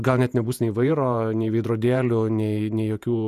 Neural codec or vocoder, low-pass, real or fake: vocoder, 48 kHz, 128 mel bands, Vocos; 14.4 kHz; fake